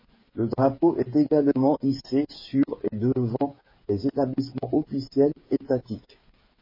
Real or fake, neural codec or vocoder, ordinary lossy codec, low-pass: fake; codec, 16 kHz, 8 kbps, FreqCodec, smaller model; MP3, 24 kbps; 5.4 kHz